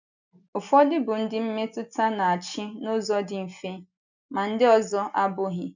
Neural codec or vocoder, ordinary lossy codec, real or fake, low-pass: none; none; real; 7.2 kHz